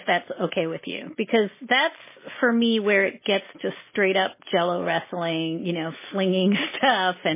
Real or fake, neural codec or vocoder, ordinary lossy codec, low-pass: real; none; MP3, 16 kbps; 3.6 kHz